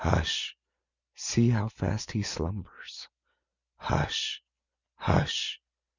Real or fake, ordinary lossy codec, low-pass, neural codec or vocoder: real; Opus, 64 kbps; 7.2 kHz; none